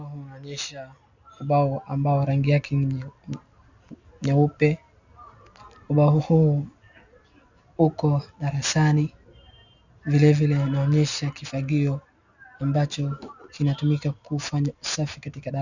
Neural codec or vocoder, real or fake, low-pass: none; real; 7.2 kHz